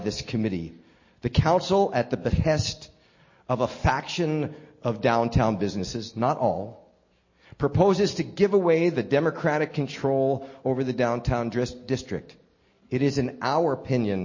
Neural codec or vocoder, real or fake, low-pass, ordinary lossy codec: none; real; 7.2 kHz; MP3, 32 kbps